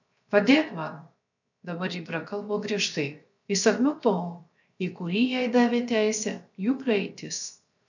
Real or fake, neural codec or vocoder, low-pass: fake; codec, 16 kHz, 0.7 kbps, FocalCodec; 7.2 kHz